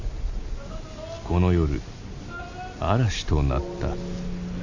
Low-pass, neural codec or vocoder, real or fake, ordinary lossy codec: 7.2 kHz; none; real; AAC, 48 kbps